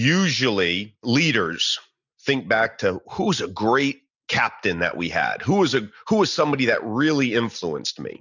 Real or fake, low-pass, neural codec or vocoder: real; 7.2 kHz; none